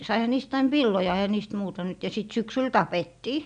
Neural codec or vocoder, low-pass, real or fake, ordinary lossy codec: none; 9.9 kHz; real; none